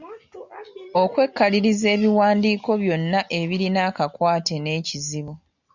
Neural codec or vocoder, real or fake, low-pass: none; real; 7.2 kHz